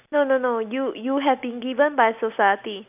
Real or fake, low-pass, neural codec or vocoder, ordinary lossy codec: real; 3.6 kHz; none; none